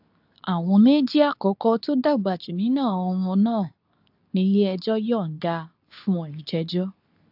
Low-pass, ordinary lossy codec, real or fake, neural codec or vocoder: 5.4 kHz; AAC, 48 kbps; fake; codec, 24 kHz, 0.9 kbps, WavTokenizer, medium speech release version 2